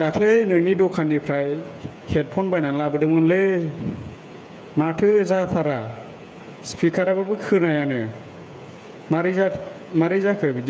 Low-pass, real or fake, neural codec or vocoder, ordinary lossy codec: none; fake; codec, 16 kHz, 8 kbps, FreqCodec, smaller model; none